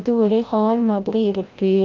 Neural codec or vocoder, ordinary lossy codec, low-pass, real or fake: codec, 16 kHz, 0.5 kbps, FreqCodec, larger model; Opus, 32 kbps; 7.2 kHz; fake